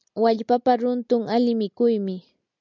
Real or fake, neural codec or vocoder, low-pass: real; none; 7.2 kHz